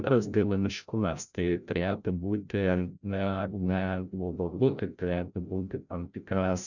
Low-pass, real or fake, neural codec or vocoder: 7.2 kHz; fake; codec, 16 kHz, 0.5 kbps, FreqCodec, larger model